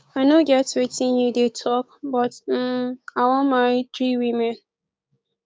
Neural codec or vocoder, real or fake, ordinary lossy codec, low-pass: codec, 16 kHz, 6 kbps, DAC; fake; none; none